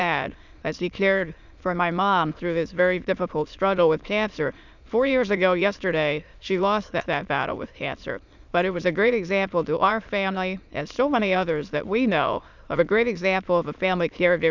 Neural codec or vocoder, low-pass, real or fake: autoencoder, 22.05 kHz, a latent of 192 numbers a frame, VITS, trained on many speakers; 7.2 kHz; fake